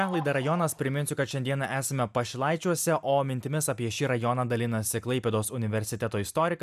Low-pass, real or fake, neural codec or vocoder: 14.4 kHz; real; none